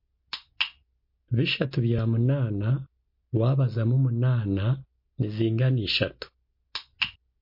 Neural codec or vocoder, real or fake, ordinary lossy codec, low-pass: none; real; MP3, 32 kbps; 5.4 kHz